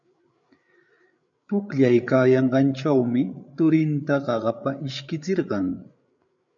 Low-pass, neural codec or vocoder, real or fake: 7.2 kHz; codec, 16 kHz, 8 kbps, FreqCodec, larger model; fake